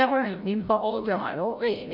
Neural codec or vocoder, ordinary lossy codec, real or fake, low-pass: codec, 16 kHz, 0.5 kbps, FreqCodec, larger model; none; fake; 5.4 kHz